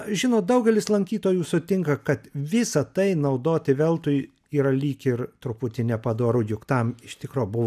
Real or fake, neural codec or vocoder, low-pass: real; none; 14.4 kHz